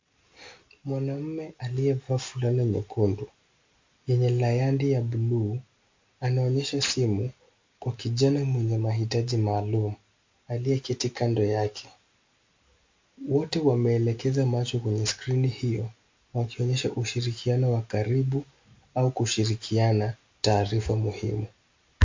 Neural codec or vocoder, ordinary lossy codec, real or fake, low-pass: none; MP3, 48 kbps; real; 7.2 kHz